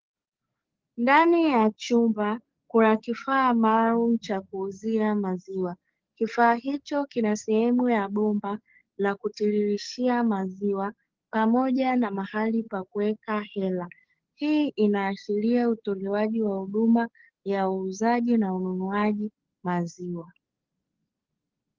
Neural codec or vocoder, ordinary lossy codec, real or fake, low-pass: codec, 44.1 kHz, 7.8 kbps, DAC; Opus, 16 kbps; fake; 7.2 kHz